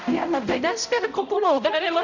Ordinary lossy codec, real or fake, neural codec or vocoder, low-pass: none; fake; codec, 16 kHz, 0.5 kbps, X-Codec, HuBERT features, trained on balanced general audio; 7.2 kHz